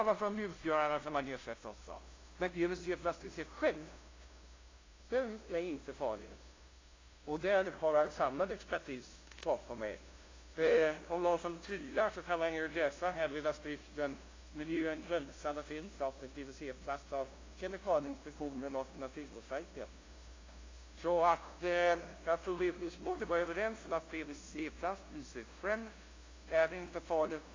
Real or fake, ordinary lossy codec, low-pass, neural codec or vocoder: fake; AAC, 32 kbps; 7.2 kHz; codec, 16 kHz, 0.5 kbps, FunCodec, trained on LibriTTS, 25 frames a second